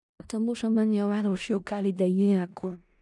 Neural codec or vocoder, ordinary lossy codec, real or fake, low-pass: codec, 16 kHz in and 24 kHz out, 0.4 kbps, LongCat-Audio-Codec, four codebook decoder; none; fake; 10.8 kHz